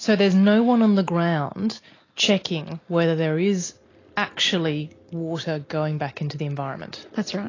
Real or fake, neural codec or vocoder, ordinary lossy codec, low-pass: real; none; AAC, 32 kbps; 7.2 kHz